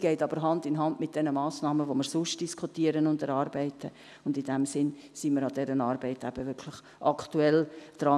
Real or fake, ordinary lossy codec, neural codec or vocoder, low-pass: real; none; none; none